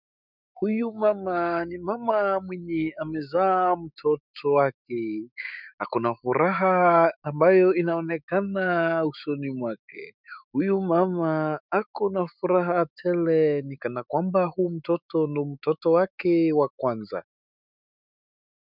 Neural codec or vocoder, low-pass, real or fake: autoencoder, 48 kHz, 128 numbers a frame, DAC-VAE, trained on Japanese speech; 5.4 kHz; fake